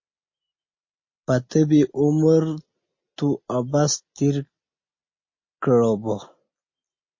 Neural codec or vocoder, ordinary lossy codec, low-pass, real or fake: none; MP3, 32 kbps; 7.2 kHz; real